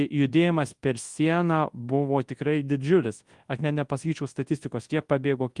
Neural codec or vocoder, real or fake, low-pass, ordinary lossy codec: codec, 24 kHz, 0.9 kbps, WavTokenizer, large speech release; fake; 10.8 kHz; Opus, 24 kbps